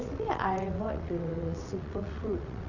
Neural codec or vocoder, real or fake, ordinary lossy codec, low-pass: vocoder, 22.05 kHz, 80 mel bands, WaveNeXt; fake; none; 7.2 kHz